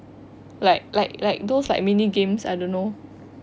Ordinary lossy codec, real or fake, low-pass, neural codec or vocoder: none; real; none; none